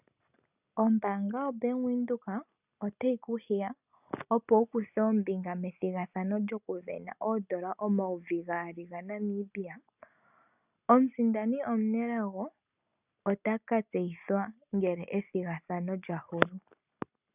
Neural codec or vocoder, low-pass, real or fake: none; 3.6 kHz; real